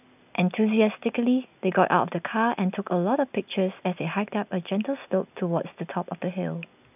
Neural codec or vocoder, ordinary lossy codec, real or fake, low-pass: none; none; real; 3.6 kHz